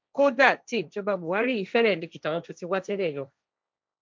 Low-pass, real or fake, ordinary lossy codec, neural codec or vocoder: none; fake; none; codec, 16 kHz, 1.1 kbps, Voila-Tokenizer